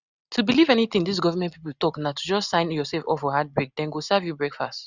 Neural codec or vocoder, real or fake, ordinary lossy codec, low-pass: none; real; none; 7.2 kHz